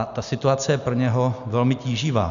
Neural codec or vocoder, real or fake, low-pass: none; real; 7.2 kHz